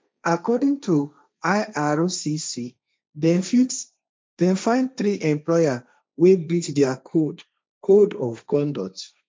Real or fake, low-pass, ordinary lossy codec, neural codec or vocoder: fake; none; none; codec, 16 kHz, 1.1 kbps, Voila-Tokenizer